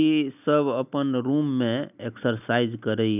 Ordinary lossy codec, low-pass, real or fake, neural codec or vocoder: none; 3.6 kHz; real; none